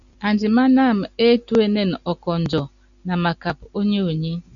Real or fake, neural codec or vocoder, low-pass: real; none; 7.2 kHz